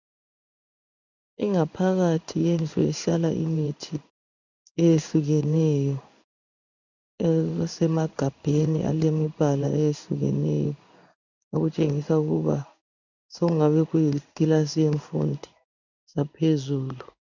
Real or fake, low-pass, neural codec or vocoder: fake; 7.2 kHz; codec, 16 kHz in and 24 kHz out, 1 kbps, XY-Tokenizer